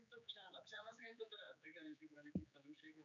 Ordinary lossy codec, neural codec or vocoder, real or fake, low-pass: MP3, 96 kbps; codec, 16 kHz, 4 kbps, X-Codec, HuBERT features, trained on general audio; fake; 7.2 kHz